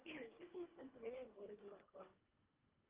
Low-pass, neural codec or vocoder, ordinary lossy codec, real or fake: 3.6 kHz; codec, 24 kHz, 1.5 kbps, HILCodec; Opus, 32 kbps; fake